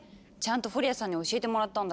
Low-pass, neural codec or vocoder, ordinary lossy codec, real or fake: none; none; none; real